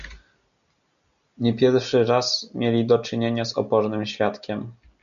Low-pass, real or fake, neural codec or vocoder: 7.2 kHz; real; none